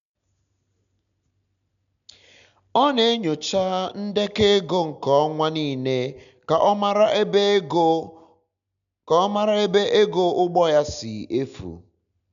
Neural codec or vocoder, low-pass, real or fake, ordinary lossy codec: none; 7.2 kHz; real; none